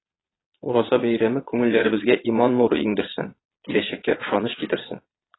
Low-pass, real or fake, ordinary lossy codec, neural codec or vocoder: 7.2 kHz; fake; AAC, 16 kbps; vocoder, 22.05 kHz, 80 mel bands, Vocos